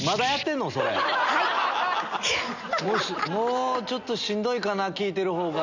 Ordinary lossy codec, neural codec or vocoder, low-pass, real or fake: none; none; 7.2 kHz; real